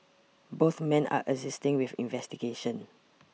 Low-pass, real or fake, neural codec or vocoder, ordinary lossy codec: none; real; none; none